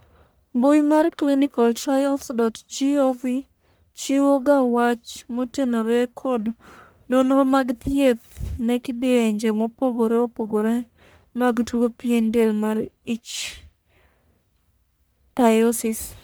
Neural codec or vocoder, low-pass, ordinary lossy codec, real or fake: codec, 44.1 kHz, 1.7 kbps, Pupu-Codec; none; none; fake